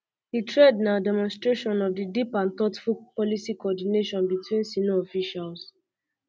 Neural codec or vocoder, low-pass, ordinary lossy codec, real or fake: none; none; none; real